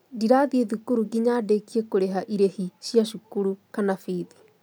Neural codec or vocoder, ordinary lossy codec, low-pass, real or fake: none; none; none; real